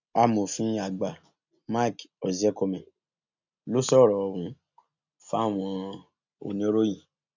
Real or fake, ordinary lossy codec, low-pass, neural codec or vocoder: real; none; 7.2 kHz; none